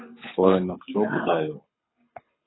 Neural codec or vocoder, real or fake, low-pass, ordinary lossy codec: codec, 24 kHz, 6 kbps, HILCodec; fake; 7.2 kHz; AAC, 16 kbps